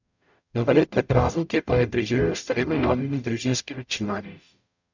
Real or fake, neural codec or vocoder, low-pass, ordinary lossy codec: fake; codec, 44.1 kHz, 0.9 kbps, DAC; 7.2 kHz; none